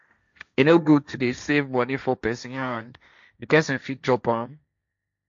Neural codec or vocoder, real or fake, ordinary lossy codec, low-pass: codec, 16 kHz, 1.1 kbps, Voila-Tokenizer; fake; MP3, 64 kbps; 7.2 kHz